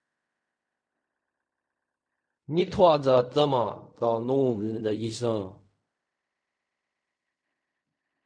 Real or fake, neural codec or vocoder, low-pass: fake; codec, 16 kHz in and 24 kHz out, 0.4 kbps, LongCat-Audio-Codec, fine tuned four codebook decoder; 9.9 kHz